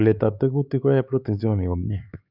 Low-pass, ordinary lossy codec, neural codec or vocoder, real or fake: 5.4 kHz; none; codec, 16 kHz, 4 kbps, X-Codec, HuBERT features, trained on LibriSpeech; fake